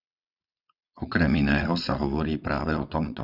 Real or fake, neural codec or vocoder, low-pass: fake; vocoder, 22.05 kHz, 80 mel bands, WaveNeXt; 5.4 kHz